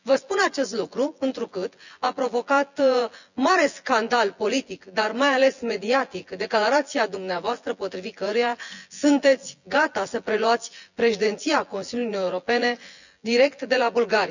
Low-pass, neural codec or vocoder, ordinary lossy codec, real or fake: 7.2 kHz; vocoder, 24 kHz, 100 mel bands, Vocos; none; fake